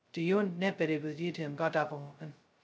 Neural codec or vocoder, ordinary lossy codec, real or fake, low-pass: codec, 16 kHz, 0.2 kbps, FocalCodec; none; fake; none